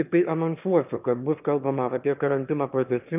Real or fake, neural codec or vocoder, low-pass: fake; autoencoder, 22.05 kHz, a latent of 192 numbers a frame, VITS, trained on one speaker; 3.6 kHz